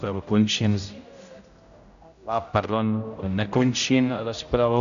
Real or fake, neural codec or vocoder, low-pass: fake; codec, 16 kHz, 0.5 kbps, X-Codec, HuBERT features, trained on general audio; 7.2 kHz